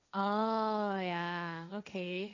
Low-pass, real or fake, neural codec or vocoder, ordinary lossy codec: 7.2 kHz; fake; codec, 16 kHz, 1.1 kbps, Voila-Tokenizer; none